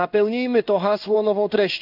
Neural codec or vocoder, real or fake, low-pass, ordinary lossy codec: codec, 16 kHz in and 24 kHz out, 1 kbps, XY-Tokenizer; fake; 5.4 kHz; none